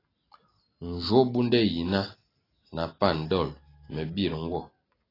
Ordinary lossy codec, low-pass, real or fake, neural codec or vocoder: AAC, 24 kbps; 5.4 kHz; real; none